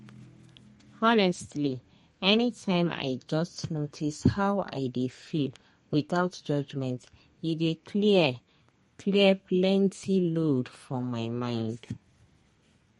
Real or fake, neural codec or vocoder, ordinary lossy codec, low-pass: fake; codec, 32 kHz, 1.9 kbps, SNAC; MP3, 48 kbps; 14.4 kHz